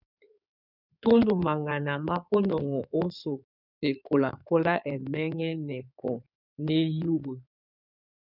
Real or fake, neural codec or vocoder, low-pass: fake; codec, 16 kHz in and 24 kHz out, 2.2 kbps, FireRedTTS-2 codec; 5.4 kHz